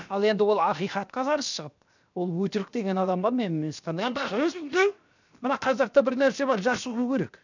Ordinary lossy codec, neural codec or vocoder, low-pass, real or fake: none; codec, 16 kHz, 0.7 kbps, FocalCodec; 7.2 kHz; fake